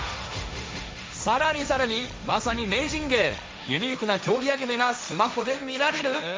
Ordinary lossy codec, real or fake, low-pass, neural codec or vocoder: none; fake; none; codec, 16 kHz, 1.1 kbps, Voila-Tokenizer